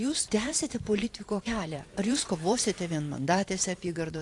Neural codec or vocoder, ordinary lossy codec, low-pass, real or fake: vocoder, 44.1 kHz, 128 mel bands every 512 samples, BigVGAN v2; AAC, 48 kbps; 10.8 kHz; fake